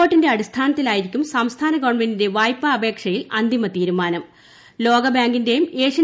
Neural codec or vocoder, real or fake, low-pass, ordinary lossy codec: none; real; none; none